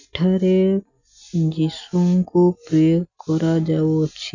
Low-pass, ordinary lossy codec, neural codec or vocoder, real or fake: 7.2 kHz; none; none; real